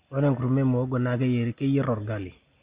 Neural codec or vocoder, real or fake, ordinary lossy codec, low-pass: none; real; Opus, 64 kbps; 3.6 kHz